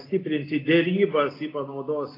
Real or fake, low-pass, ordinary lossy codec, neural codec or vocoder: real; 5.4 kHz; AAC, 24 kbps; none